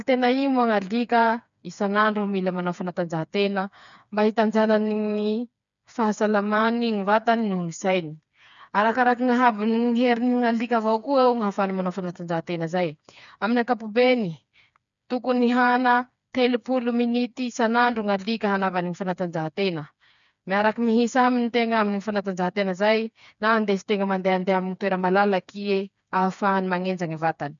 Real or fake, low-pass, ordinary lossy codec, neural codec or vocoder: fake; 7.2 kHz; none; codec, 16 kHz, 4 kbps, FreqCodec, smaller model